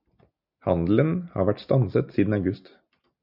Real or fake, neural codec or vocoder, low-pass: real; none; 5.4 kHz